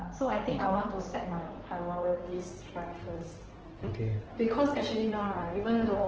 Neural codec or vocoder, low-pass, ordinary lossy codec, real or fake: codec, 16 kHz in and 24 kHz out, 2.2 kbps, FireRedTTS-2 codec; 7.2 kHz; Opus, 16 kbps; fake